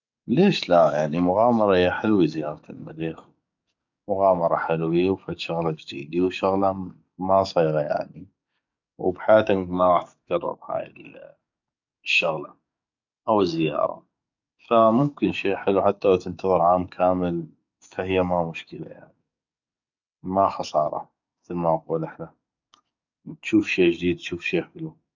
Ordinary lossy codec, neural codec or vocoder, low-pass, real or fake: none; codec, 44.1 kHz, 7.8 kbps, DAC; 7.2 kHz; fake